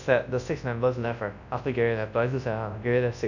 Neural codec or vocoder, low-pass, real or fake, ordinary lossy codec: codec, 24 kHz, 0.9 kbps, WavTokenizer, large speech release; 7.2 kHz; fake; none